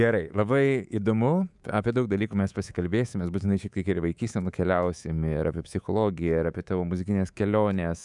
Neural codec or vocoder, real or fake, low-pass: codec, 24 kHz, 3.1 kbps, DualCodec; fake; 10.8 kHz